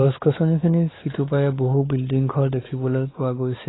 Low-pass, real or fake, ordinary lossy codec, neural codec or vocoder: 7.2 kHz; fake; AAC, 16 kbps; codec, 16 kHz, 4 kbps, X-Codec, WavLM features, trained on Multilingual LibriSpeech